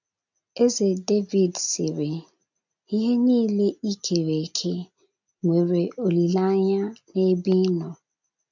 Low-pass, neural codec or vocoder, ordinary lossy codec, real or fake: 7.2 kHz; none; none; real